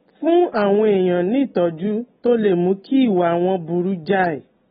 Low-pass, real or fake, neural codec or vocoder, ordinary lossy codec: 9.9 kHz; real; none; AAC, 16 kbps